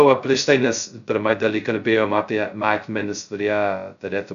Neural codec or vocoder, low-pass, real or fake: codec, 16 kHz, 0.2 kbps, FocalCodec; 7.2 kHz; fake